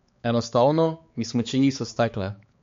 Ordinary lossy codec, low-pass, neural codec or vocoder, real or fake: MP3, 48 kbps; 7.2 kHz; codec, 16 kHz, 4 kbps, X-Codec, HuBERT features, trained on balanced general audio; fake